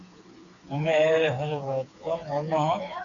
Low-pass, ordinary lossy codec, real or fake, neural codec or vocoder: 7.2 kHz; MP3, 96 kbps; fake; codec, 16 kHz, 4 kbps, FreqCodec, smaller model